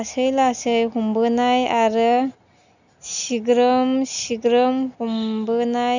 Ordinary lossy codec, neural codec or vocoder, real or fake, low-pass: none; none; real; 7.2 kHz